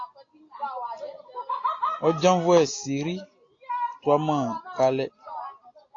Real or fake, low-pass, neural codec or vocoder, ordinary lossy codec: real; 7.2 kHz; none; AAC, 32 kbps